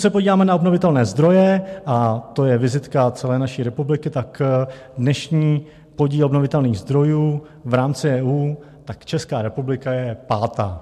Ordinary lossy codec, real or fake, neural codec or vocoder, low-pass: MP3, 64 kbps; real; none; 14.4 kHz